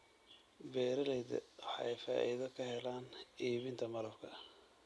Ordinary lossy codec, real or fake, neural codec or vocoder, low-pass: none; real; none; none